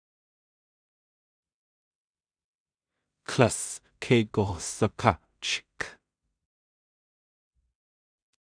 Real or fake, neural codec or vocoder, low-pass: fake; codec, 16 kHz in and 24 kHz out, 0.4 kbps, LongCat-Audio-Codec, two codebook decoder; 9.9 kHz